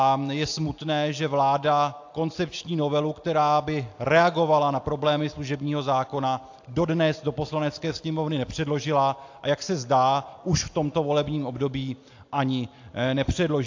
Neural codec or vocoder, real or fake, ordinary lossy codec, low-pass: none; real; AAC, 48 kbps; 7.2 kHz